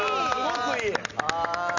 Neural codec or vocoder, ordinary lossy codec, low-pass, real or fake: none; none; 7.2 kHz; real